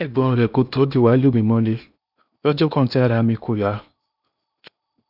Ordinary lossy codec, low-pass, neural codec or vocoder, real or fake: none; 5.4 kHz; codec, 16 kHz in and 24 kHz out, 0.8 kbps, FocalCodec, streaming, 65536 codes; fake